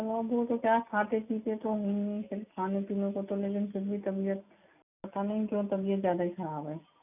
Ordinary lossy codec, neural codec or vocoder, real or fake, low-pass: none; none; real; 3.6 kHz